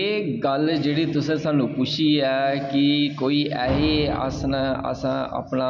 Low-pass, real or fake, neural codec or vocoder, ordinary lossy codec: 7.2 kHz; real; none; none